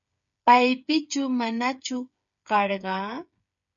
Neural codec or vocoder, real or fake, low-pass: codec, 16 kHz, 8 kbps, FreqCodec, smaller model; fake; 7.2 kHz